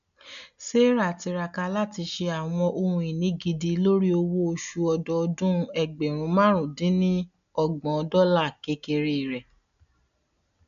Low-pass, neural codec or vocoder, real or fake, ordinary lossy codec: 7.2 kHz; none; real; none